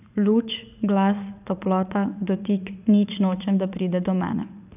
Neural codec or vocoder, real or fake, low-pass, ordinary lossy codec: vocoder, 44.1 kHz, 80 mel bands, Vocos; fake; 3.6 kHz; none